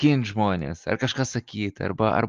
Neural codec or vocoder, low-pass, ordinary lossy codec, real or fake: none; 7.2 kHz; Opus, 24 kbps; real